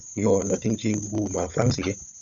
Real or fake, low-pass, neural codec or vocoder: fake; 7.2 kHz; codec, 16 kHz, 16 kbps, FunCodec, trained on Chinese and English, 50 frames a second